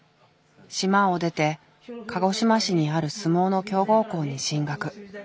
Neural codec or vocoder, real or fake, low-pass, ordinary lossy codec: none; real; none; none